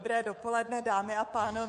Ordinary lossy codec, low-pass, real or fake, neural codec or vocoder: MP3, 48 kbps; 10.8 kHz; fake; codec, 24 kHz, 3.1 kbps, DualCodec